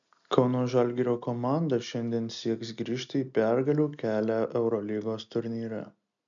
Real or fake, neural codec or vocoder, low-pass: real; none; 7.2 kHz